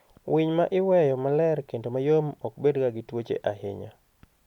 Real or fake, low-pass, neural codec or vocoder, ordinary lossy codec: real; 19.8 kHz; none; none